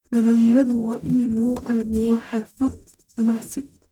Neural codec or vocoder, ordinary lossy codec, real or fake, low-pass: codec, 44.1 kHz, 0.9 kbps, DAC; none; fake; 19.8 kHz